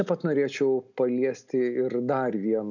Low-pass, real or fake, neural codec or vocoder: 7.2 kHz; real; none